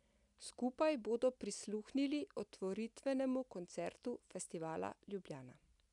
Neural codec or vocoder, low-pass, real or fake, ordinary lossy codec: none; 10.8 kHz; real; none